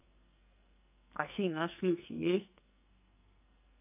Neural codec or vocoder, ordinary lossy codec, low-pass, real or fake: codec, 44.1 kHz, 2.6 kbps, SNAC; none; 3.6 kHz; fake